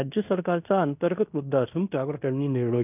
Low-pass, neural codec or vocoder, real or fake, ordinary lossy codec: 3.6 kHz; codec, 16 kHz in and 24 kHz out, 0.9 kbps, LongCat-Audio-Codec, fine tuned four codebook decoder; fake; none